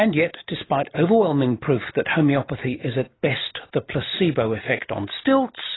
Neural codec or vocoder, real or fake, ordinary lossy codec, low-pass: none; real; AAC, 16 kbps; 7.2 kHz